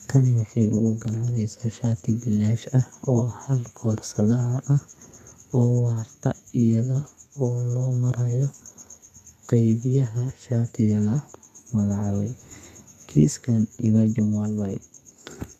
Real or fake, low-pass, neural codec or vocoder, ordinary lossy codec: fake; 14.4 kHz; codec, 32 kHz, 1.9 kbps, SNAC; none